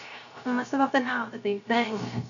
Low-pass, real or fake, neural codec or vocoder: 7.2 kHz; fake; codec, 16 kHz, 0.3 kbps, FocalCodec